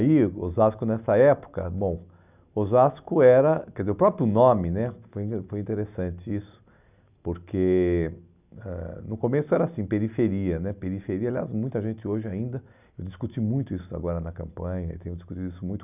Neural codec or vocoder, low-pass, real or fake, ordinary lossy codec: none; 3.6 kHz; real; none